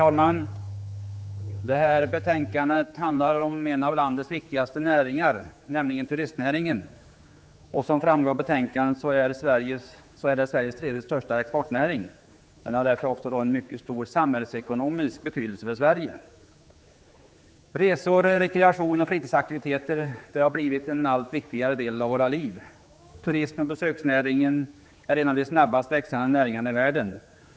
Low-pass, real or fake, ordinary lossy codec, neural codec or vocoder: none; fake; none; codec, 16 kHz, 4 kbps, X-Codec, HuBERT features, trained on general audio